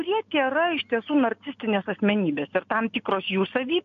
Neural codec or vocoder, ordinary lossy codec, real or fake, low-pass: none; AAC, 48 kbps; real; 7.2 kHz